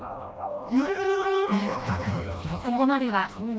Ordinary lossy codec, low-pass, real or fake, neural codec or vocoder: none; none; fake; codec, 16 kHz, 1 kbps, FreqCodec, smaller model